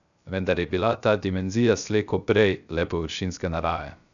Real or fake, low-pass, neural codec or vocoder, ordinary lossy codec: fake; 7.2 kHz; codec, 16 kHz, 0.3 kbps, FocalCodec; none